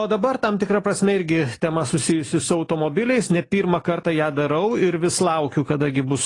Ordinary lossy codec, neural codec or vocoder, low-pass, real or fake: AAC, 32 kbps; none; 10.8 kHz; real